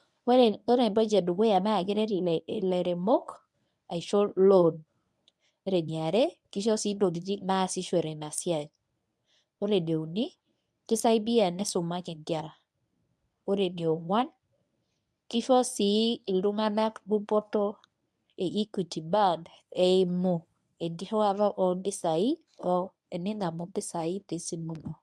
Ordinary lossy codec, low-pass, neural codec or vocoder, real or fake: none; none; codec, 24 kHz, 0.9 kbps, WavTokenizer, medium speech release version 1; fake